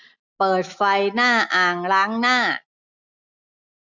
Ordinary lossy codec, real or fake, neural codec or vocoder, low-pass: none; real; none; 7.2 kHz